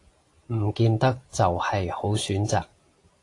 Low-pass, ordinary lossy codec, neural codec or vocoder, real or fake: 10.8 kHz; AAC, 48 kbps; none; real